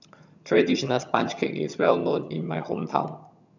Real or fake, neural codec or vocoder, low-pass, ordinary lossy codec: fake; vocoder, 22.05 kHz, 80 mel bands, HiFi-GAN; 7.2 kHz; none